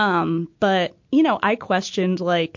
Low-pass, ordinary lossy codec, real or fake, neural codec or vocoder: 7.2 kHz; MP3, 48 kbps; real; none